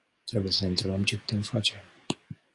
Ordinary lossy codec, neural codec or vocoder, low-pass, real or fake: Opus, 64 kbps; codec, 44.1 kHz, 7.8 kbps, DAC; 10.8 kHz; fake